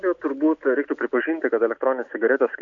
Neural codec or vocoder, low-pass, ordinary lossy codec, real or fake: none; 7.2 kHz; AAC, 64 kbps; real